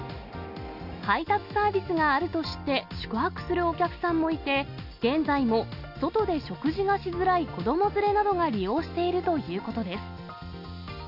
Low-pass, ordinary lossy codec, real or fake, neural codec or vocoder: 5.4 kHz; none; real; none